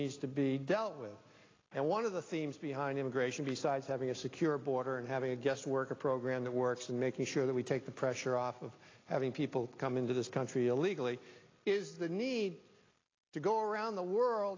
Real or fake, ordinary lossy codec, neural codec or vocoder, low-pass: real; AAC, 32 kbps; none; 7.2 kHz